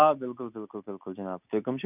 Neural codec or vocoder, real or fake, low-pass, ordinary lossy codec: none; real; 3.6 kHz; none